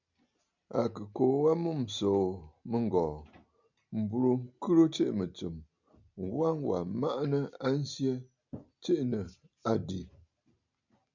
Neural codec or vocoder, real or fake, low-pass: none; real; 7.2 kHz